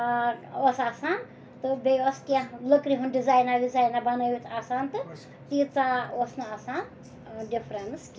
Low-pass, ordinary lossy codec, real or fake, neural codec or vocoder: none; none; real; none